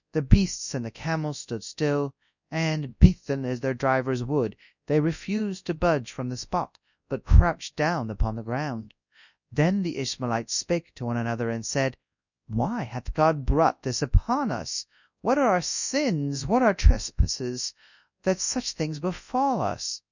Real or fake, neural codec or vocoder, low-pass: fake; codec, 24 kHz, 0.9 kbps, WavTokenizer, large speech release; 7.2 kHz